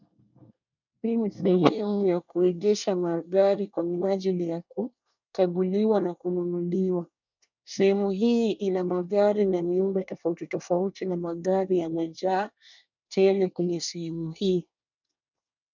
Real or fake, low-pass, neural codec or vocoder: fake; 7.2 kHz; codec, 24 kHz, 1 kbps, SNAC